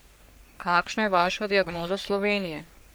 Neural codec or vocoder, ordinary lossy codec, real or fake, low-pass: codec, 44.1 kHz, 3.4 kbps, Pupu-Codec; none; fake; none